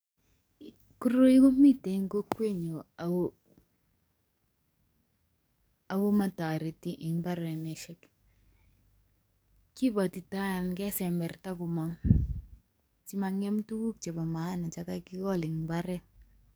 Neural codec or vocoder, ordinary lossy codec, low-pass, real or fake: codec, 44.1 kHz, 7.8 kbps, DAC; none; none; fake